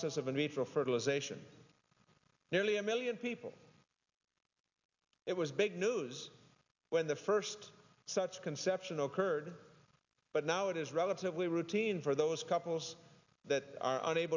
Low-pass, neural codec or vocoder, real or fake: 7.2 kHz; none; real